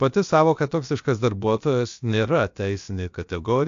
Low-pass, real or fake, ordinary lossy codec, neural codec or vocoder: 7.2 kHz; fake; AAC, 64 kbps; codec, 16 kHz, about 1 kbps, DyCAST, with the encoder's durations